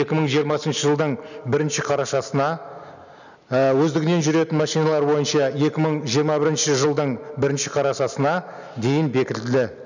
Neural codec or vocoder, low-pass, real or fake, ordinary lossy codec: none; 7.2 kHz; real; none